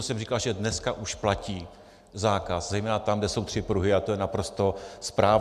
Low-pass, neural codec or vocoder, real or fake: 14.4 kHz; none; real